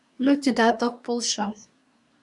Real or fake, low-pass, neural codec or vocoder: fake; 10.8 kHz; codec, 24 kHz, 1 kbps, SNAC